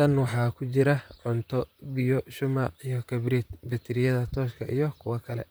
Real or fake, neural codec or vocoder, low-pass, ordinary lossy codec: fake; vocoder, 44.1 kHz, 128 mel bands, Pupu-Vocoder; none; none